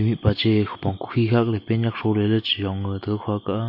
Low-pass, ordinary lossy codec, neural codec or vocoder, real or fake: 5.4 kHz; MP3, 32 kbps; none; real